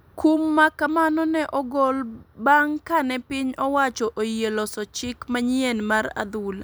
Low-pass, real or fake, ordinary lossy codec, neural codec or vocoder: none; real; none; none